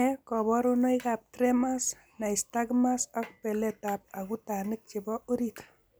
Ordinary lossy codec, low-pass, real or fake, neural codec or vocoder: none; none; real; none